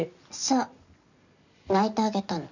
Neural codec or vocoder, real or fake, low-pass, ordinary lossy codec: none; real; 7.2 kHz; none